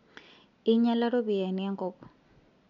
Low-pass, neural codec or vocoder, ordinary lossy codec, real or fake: 7.2 kHz; none; none; real